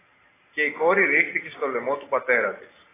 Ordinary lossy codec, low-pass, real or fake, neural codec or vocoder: AAC, 16 kbps; 3.6 kHz; real; none